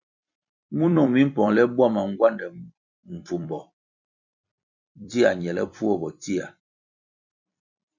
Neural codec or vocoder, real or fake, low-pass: vocoder, 44.1 kHz, 128 mel bands every 256 samples, BigVGAN v2; fake; 7.2 kHz